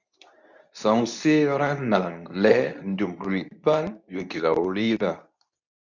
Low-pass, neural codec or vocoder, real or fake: 7.2 kHz; codec, 24 kHz, 0.9 kbps, WavTokenizer, medium speech release version 2; fake